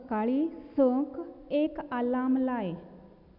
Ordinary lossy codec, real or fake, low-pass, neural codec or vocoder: none; real; 5.4 kHz; none